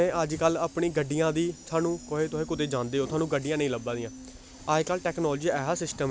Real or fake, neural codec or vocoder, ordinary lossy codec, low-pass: real; none; none; none